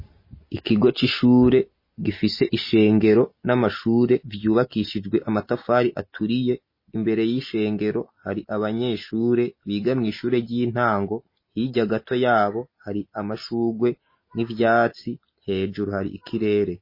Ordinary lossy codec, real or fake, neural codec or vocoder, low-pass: MP3, 24 kbps; real; none; 5.4 kHz